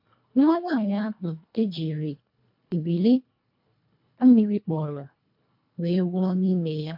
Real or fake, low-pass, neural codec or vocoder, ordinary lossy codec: fake; 5.4 kHz; codec, 24 kHz, 1.5 kbps, HILCodec; MP3, 48 kbps